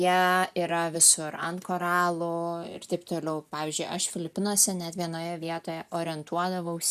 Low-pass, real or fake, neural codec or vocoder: 14.4 kHz; real; none